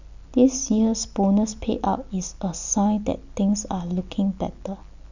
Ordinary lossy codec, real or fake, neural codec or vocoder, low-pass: none; real; none; 7.2 kHz